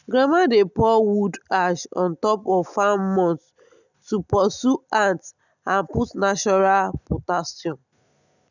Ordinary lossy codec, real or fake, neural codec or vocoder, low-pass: none; real; none; 7.2 kHz